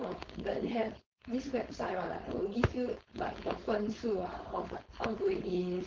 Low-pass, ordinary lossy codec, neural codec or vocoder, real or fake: 7.2 kHz; Opus, 16 kbps; codec, 16 kHz, 4.8 kbps, FACodec; fake